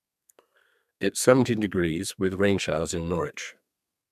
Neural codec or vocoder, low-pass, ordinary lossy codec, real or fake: codec, 32 kHz, 1.9 kbps, SNAC; 14.4 kHz; none; fake